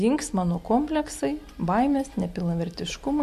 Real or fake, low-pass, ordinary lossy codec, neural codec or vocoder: real; 14.4 kHz; MP3, 64 kbps; none